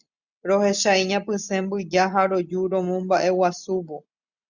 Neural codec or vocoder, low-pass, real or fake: none; 7.2 kHz; real